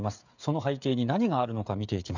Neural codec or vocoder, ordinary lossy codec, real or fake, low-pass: codec, 16 kHz, 8 kbps, FreqCodec, smaller model; none; fake; 7.2 kHz